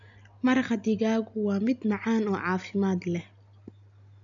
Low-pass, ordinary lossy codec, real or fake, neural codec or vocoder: 7.2 kHz; none; real; none